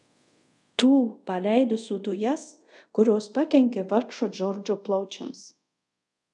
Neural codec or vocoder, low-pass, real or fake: codec, 24 kHz, 0.5 kbps, DualCodec; 10.8 kHz; fake